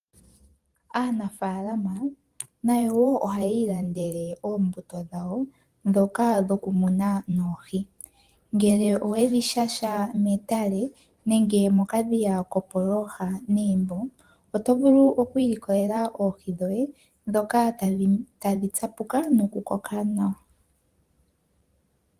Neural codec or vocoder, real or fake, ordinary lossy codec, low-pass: vocoder, 44.1 kHz, 128 mel bands every 512 samples, BigVGAN v2; fake; Opus, 24 kbps; 14.4 kHz